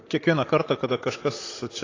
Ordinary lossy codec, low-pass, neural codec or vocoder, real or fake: AAC, 32 kbps; 7.2 kHz; vocoder, 44.1 kHz, 128 mel bands, Pupu-Vocoder; fake